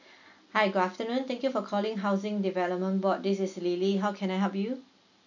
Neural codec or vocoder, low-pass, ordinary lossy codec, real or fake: none; 7.2 kHz; none; real